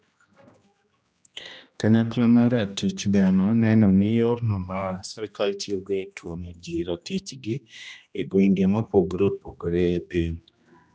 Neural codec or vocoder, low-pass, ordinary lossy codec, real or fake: codec, 16 kHz, 1 kbps, X-Codec, HuBERT features, trained on general audio; none; none; fake